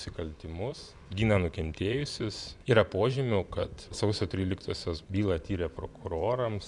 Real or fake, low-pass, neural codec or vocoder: fake; 10.8 kHz; vocoder, 24 kHz, 100 mel bands, Vocos